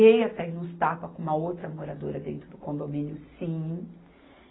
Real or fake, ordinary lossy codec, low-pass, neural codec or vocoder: real; AAC, 16 kbps; 7.2 kHz; none